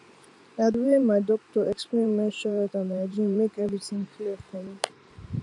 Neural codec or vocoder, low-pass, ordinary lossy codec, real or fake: vocoder, 44.1 kHz, 128 mel bands every 512 samples, BigVGAN v2; 10.8 kHz; none; fake